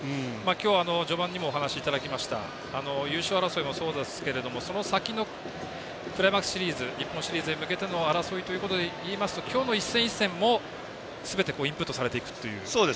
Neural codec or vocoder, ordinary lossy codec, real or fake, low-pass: none; none; real; none